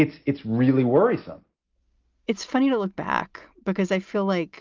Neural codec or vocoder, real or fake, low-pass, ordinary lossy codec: none; real; 7.2 kHz; Opus, 24 kbps